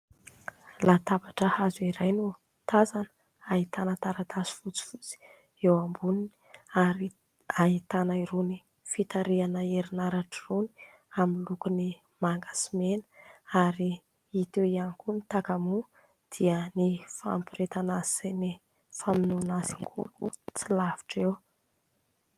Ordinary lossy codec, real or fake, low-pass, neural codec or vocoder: Opus, 32 kbps; real; 14.4 kHz; none